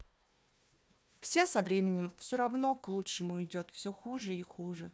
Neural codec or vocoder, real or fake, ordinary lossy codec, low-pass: codec, 16 kHz, 1 kbps, FunCodec, trained on Chinese and English, 50 frames a second; fake; none; none